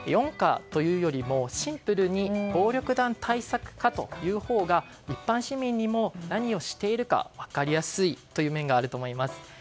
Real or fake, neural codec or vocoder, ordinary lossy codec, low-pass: real; none; none; none